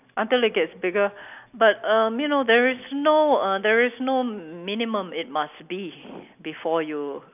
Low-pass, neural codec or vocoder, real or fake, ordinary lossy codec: 3.6 kHz; none; real; none